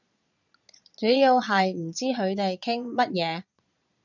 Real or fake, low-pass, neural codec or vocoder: fake; 7.2 kHz; vocoder, 22.05 kHz, 80 mel bands, Vocos